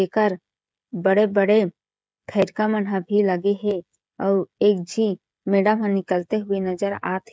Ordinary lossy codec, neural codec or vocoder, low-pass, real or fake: none; codec, 16 kHz, 16 kbps, FreqCodec, smaller model; none; fake